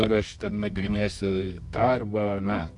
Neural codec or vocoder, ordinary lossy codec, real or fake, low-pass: codec, 24 kHz, 0.9 kbps, WavTokenizer, medium music audio release; AAC, 64 kbps; fake; 10.8 kHz